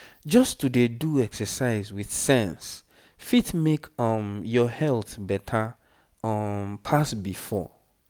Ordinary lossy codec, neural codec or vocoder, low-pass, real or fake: none; none; none; real